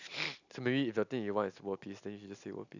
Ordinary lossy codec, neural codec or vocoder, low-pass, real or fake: none; none; 7.2 kHz; real